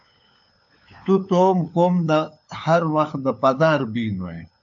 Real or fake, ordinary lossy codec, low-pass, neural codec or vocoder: fake; AAC, 64 kbps; 7.2 kHz; codec, 16 kHz, 4 kbps, FunCodec, trained on LibriTTS, 50 frames a second